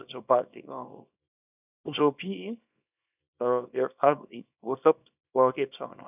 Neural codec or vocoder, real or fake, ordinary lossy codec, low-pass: codec, 24 kHz, 0.9 kbps, WavTokenizer, small release; fake; none; 3.6 kHz